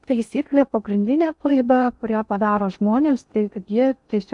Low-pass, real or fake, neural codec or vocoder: 10.8 kHz; fake; codec, 16 kHz in and 24 kHz out, 0.6 kbps, FocalCodec, streaming, 4096 codes